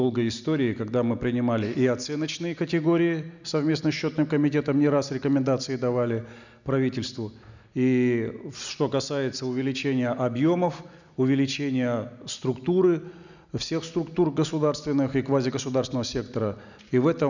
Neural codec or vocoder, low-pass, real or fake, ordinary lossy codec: none; 7.2 kHz; real; none